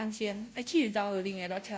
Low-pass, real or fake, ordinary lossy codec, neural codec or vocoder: none; fake; none; codec, 16 kHz, 0.5 kbps, FunCodec, trained on Chinese and English, 25 frames a second